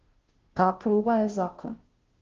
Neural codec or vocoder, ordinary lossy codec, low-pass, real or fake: codec, 16 kHz, 0.5 kbps, FunCodec, trained on Chinese and English, 25 frames a second; Opus, 16 kbps; 7.2 kHz; fake